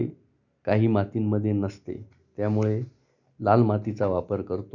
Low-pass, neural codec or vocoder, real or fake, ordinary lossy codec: 7.2 kHz; none; real; none